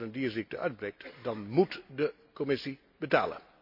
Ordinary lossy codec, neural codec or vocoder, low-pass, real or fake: MP3, 48 kbps; none; 5.4 kHz; real